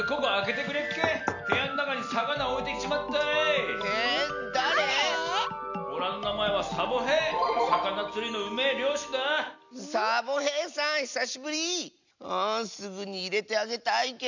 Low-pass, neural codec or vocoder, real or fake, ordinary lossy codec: 7.2 kHz; none; real; none